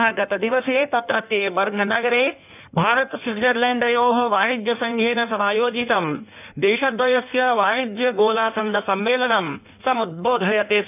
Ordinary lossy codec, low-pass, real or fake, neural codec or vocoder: none; 3.6 kHz; fake; codec, 16 kHz in and 24 kHz out, 1.1 kbps, FireRedTTS-2 codec